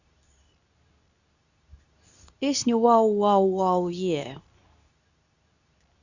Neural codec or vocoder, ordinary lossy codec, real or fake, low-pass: codec, 24 kHz, 0.9 kbps, WavTokenizer, medium speech release version 2; none; fake; 7.2 kHz